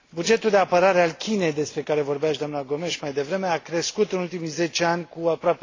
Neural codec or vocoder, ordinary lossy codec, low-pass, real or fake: none; AAC, 32 kbps; 7.2 kHz; real